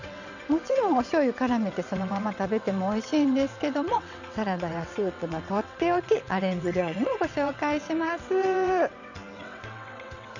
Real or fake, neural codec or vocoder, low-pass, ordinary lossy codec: fake; vocoder, 22.05 kHz, 80 mel bands, WaveNeXt; 7.2 kHz; none